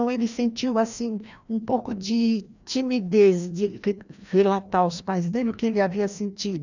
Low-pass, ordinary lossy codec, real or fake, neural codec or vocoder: 7.2 kHz; none; fake; codec, 16 kHz, 1 kbps, FreqCodec, larger model